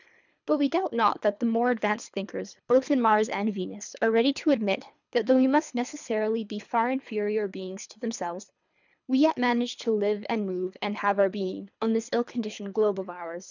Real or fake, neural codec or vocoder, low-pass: fake; codec, 24 kHz, 3 kbps, HILCodec; 7.2 kHz